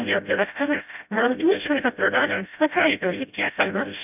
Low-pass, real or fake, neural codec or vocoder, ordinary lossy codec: 3.6 kHz; fake; codec, 16 kHz, 0.5 kbps, FreqCodec, smaller model; none